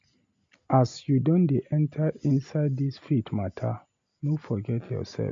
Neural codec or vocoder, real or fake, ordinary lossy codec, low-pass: none; real; MP3, 48 kbps; 7.2 kHz